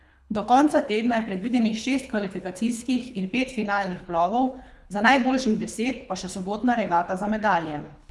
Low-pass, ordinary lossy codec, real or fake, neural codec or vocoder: none; none; fake; codec, 24 kHz, 3 kbps, HILCodec